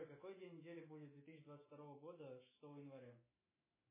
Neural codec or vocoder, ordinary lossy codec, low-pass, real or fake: autoencoder, 48 kHz, 128 numbers a frame, DAC-VAE, trained on Japanese speech; MP3, 16 kbps; 3.6 kHz; fake